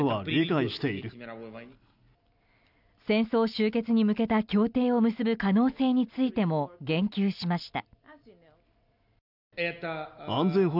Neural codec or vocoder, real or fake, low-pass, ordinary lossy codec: none; real; 5.4 kHz; none